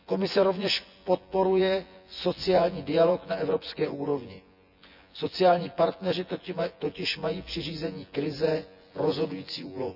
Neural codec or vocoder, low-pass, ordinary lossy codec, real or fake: vocoder, 24 kHz, 100 mel bands, Vocos; 5.4 kHz; none; fake